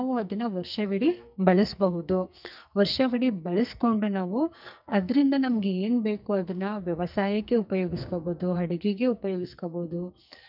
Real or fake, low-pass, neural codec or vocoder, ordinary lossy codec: fake; 5.4 kHz; codec, 44.1 kHz, 2.6 kbps, SNAC; none